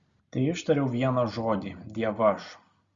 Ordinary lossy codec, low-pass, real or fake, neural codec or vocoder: Opus, 64 kbps; 7.2 kHz; real; none